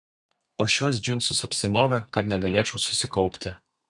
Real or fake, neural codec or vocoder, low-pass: fake; codec, 32 kHz, 1.9 kbps, SNAC; 10.8 kHz